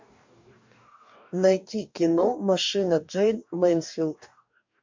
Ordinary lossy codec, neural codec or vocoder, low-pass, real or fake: MP3, 48 kbps; codec, 44.1 kHz, 2.6 kbps, DAC; 7.2 kHz; fake